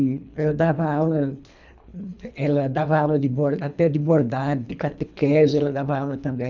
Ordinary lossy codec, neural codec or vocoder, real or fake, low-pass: none; codec, 24 kHz, 3 kbps, HILCodec; fake; 7.2 kHz